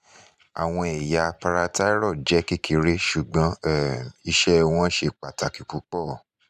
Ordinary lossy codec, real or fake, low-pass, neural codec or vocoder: none; fake; 14.4 kHz; vocoder, 44.1 kHz, 128 mel bands every 512 samples, BigVGAN v2